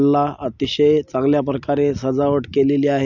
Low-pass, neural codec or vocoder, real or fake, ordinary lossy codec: 7.2 kHz; none; real; none